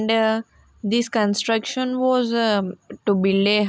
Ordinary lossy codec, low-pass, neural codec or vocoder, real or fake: none; none; none; real